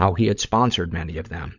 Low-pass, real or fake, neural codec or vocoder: 7.2 kHz; fake; codec, 16 kHz, 16 kbps, FunCodec, trained on LibriTTS, 50 frames a second